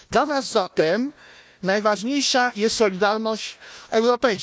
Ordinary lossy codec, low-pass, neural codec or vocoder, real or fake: none; none; codec, 16 kHz, 1 kbps, FunCodec, trained on Chinese and English, 50 frames a second; fake